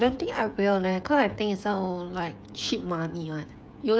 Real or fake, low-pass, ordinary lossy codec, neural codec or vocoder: fake; none; none; codec, 16 kHz, 16 kbps, FreqCodec, smaller model